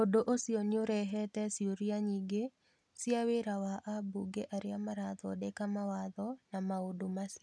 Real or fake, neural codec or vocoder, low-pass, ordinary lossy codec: real; none; none; none